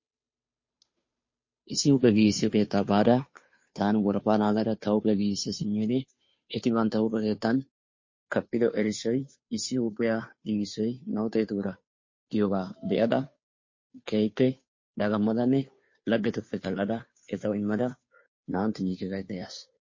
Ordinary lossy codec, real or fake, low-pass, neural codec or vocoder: MP3, 32 kbps; fake; 7.2 kHz; codec, 16 kHz, 2 kbps, FunCodec, trained on Chinese and English, 25 frames a second